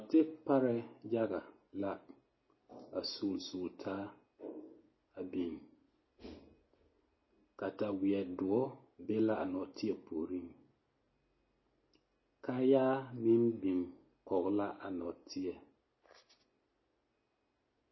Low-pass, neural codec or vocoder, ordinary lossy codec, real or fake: 7.2 kHz; none; MP3, 24 kbps; real